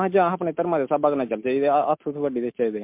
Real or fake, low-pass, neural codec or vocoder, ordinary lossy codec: real; 3.6 kHz; none; MP3, 32 kbps